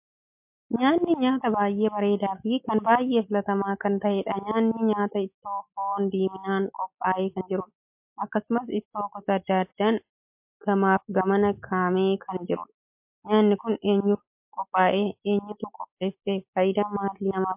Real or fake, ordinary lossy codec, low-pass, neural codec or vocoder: real; MP3, 32 kbps; 3.6 kHz; none